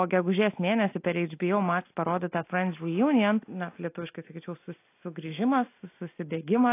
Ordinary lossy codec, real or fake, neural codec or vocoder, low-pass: AAC, 24 kbps; real; none; 3.6 kHz